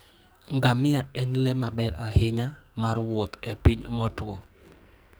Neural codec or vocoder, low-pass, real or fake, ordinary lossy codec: codec, 44.1 kHz, 2.6 kbps, SNAC; none; fake; none